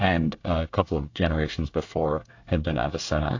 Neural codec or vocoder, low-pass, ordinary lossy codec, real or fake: codec, 24 kHz, 1 kbps, SNAC; 7.2 kHz; AAC, 48 kbps; fake